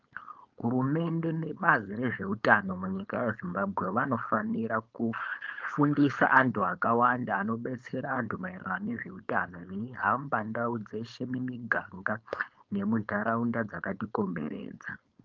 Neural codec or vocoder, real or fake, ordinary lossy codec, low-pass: codec, 16 kHz, 4.8 kbps, FACodec; fake; Opus, 24 kbps; 7.2 kHz